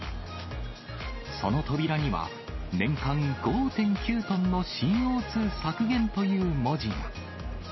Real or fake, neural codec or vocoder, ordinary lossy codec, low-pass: real; none; MP3, 24 kbps; 7.2 kHz